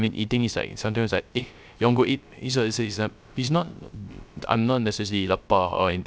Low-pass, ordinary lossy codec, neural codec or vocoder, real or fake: none; none; codec, 16 kHz, 0.3 kbps, FocalCodec; fake